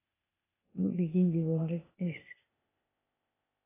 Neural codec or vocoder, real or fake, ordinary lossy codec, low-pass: codec, 16 kHz, 0.8 kbps, ZipCodec; fake; AAC, 16 kbps; 3.6 kHz